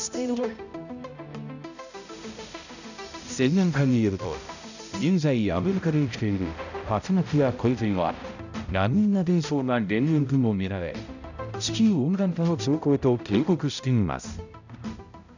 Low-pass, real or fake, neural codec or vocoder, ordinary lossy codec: 7.2 kHz; fake; codec, 16 kHz, 0.5 kbps, X-Codec, HuBERT features, trained on balanced general audio; none